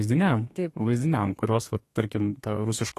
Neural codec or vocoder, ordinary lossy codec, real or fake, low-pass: codec, 32 kHz, 1.9 kbps, SNAC; AAC, 48 kbps; fake; 14.4 kHz